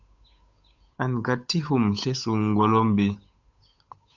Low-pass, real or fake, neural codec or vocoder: 7.2 kHz; fake; codec, 16 kHz, 8 kbps, FunCodec, trained on Chinese and English, 25 frames a second